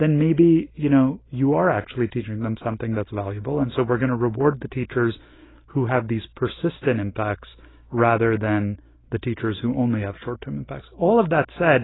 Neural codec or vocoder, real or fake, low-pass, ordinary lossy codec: none; real; 7.2 kHz; AAC, 16 kbps